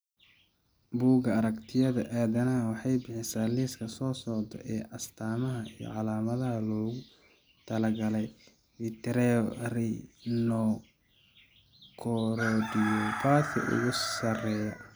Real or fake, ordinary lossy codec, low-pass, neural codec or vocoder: real; none; none; none